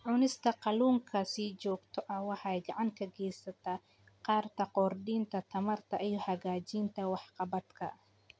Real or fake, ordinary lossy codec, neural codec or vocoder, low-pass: real; none; none; none